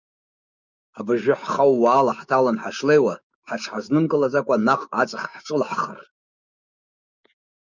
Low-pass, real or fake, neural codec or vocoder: 7.2 kHz; fake; codec, 16 kHz, 6 kbps, DAC